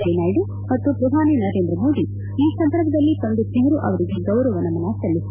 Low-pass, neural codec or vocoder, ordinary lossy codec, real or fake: 3.6 kHz; none; none; real